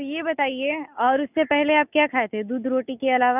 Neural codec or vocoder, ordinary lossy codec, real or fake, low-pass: none; none; real; 3.6 kHz